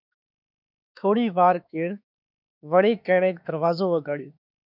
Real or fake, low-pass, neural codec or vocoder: fake; 5.4 kHz; autoencoder, 48 kHz, 32 numbers a frame, DAC-VAE, trained on Japanese speech